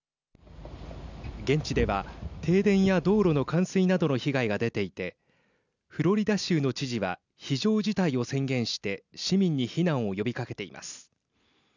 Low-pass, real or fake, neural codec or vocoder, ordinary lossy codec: 7.2 kHz; real; none; none